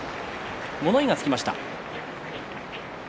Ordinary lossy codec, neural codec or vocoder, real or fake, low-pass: none; none; real; none